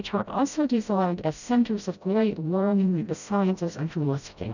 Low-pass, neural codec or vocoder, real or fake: 7.2 kHz; codec, 16 kHz, 0.5 kbps, FreqCodec, smaller model; fake